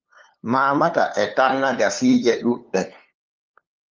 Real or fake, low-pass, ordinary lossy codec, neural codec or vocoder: fake; 7.2 kHz; Opus, 24 kbps; codec, 16 kHz, 2 kbps, FunCodec, trained on LibriTTS, 25 frames a second